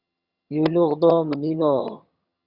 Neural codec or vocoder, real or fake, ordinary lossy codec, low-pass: vocoder, 22.05 kHz, 80 mel bands, HiFi-GAN; fake; Opus, 64 kbps; 5.4 kHz